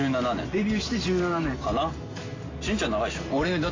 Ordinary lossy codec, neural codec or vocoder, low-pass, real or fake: AAC, 32 kbps; none; 7.2 kHz; real